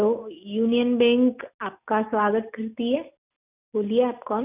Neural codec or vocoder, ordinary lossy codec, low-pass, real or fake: none; none; 3.6 kHz; real